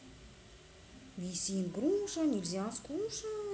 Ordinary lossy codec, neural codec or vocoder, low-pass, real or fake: none; none; none; real